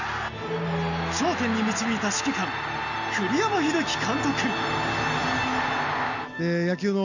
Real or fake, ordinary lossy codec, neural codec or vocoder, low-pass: real; none; none; 7.2 kHz